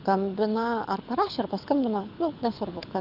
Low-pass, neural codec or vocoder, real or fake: 5.4 kHz; codec, 24 kHz, 6 kbps, HILCodec; fake